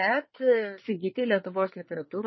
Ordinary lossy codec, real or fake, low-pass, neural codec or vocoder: MP3, 24 kbps; fake; 7.2 kHz; codec, 24 kHz, 1 kbps, SNAC